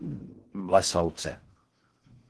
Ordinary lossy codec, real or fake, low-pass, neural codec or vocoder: Opus, 16 kbps; fake; 10.8 kHz; codec, 16 kHz in and 24 kHz out, 0.6 kbps, FocalCodec, streaming, 4096 codes